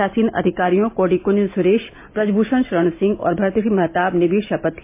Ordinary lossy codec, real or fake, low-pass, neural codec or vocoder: MP3, 24 kbps; real; 3.6 kHz; none